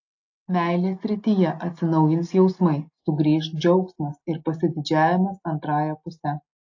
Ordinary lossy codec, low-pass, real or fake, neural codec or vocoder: AAC, 48 kbps; 7.2 kHz; real; none